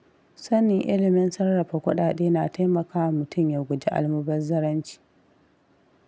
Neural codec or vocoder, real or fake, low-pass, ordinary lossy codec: none; real; none; none